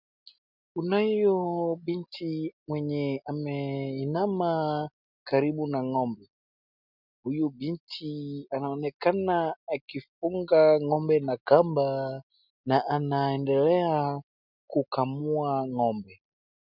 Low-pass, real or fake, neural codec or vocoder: 5.4 kHz; real; none